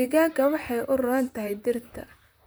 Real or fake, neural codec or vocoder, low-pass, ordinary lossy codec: fake; vocoder, 44.1 kHz, 128 mel bands, Pupu-Vocoder; none; none